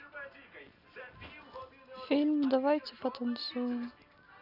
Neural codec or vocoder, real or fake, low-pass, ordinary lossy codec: none; real; 5.4 kHz; none